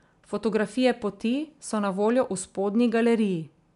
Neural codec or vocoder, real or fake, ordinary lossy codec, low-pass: none; real; none; 10.8 kHz